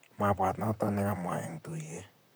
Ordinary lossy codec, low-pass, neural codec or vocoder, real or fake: none; none; vocoder, 44.1 kHz, 128 mel bands, Pupu-Vocoder; fake